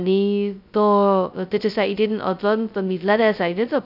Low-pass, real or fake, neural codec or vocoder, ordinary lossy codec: 5.4 kHz; fake; codec, 16 kHz, 0.2 kbps, FocalCodec; none